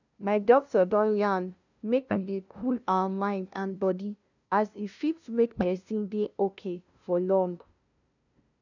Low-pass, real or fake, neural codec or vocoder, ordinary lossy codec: 7.2 kHz; fake; codec, 16 kHz, 0.5 kbps, FunCodec, trained on LibriTTS, 25 frames a second; none